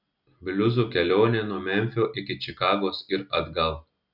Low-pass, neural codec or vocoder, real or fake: 5.4 kHz; none; real